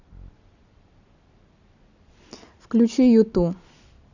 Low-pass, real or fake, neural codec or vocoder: 7.2 kHz; real; none